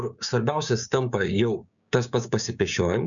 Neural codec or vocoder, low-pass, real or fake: codec, 16 kHz, 6 kbps, DAC; 7.2 kHz; fake